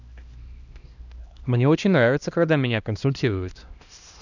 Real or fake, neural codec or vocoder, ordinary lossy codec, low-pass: fake; codec, 16 kHz, 1 kbps, X-Codec, HuBERT features, trained on LibriSpeech; none; 7.2 kHz